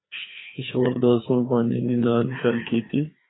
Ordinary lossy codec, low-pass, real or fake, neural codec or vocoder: AAC, 16 kbps; 7.2 kHz; fake; codec, 16 kHz, 2 kbps, FreqCodec, larger model